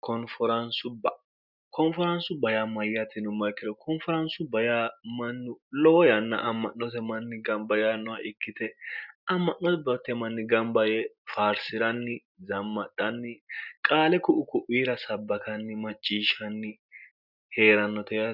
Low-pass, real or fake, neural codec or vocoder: 5.4 kHz; real; none